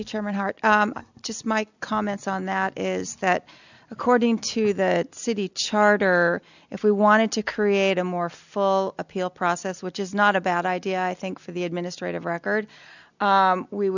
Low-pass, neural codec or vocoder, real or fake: 7.2 kHz; none; real